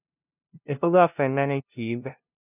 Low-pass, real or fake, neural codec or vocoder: 3.6 kHz; fake; codec, 16 kHz, 0.5 kbps, FunCodec, trained on LibriTTS, 25 frames a second